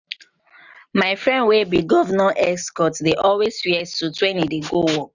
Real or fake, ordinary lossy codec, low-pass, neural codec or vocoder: real; none; 7.2 kHz; none